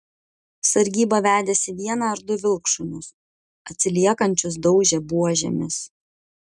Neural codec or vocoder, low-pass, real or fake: none; 10.8 kHz; real